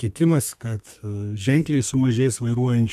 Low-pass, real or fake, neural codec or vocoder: 14.4 kHz; fake; codec, 32 kHz, 1.9 kbps, SNAC